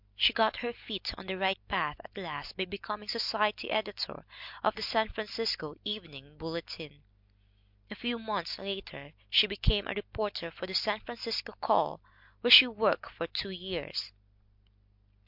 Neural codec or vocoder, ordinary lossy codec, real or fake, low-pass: none; MP3, 48 kbps; real; 5.4 kHz